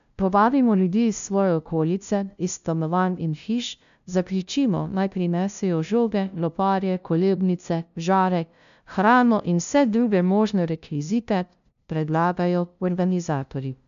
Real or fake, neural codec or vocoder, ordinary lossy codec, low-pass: fake; codec, 16 kHz, 0.5 kbps, FunCodec, trained on LibriTTS, 25 frames a second; none; 7.2 kHz